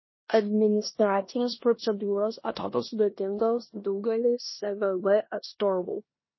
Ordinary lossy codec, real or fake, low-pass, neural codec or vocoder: MP3, 24 kbps; fake; 7.2 kHz; codec, 16 kHz in and 24 kHz out, 0.9 kbps, LongCat-Audio-Codec, four codebook decoder